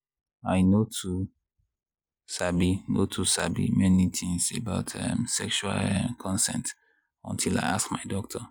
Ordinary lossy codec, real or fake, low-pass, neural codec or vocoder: none; real; none; none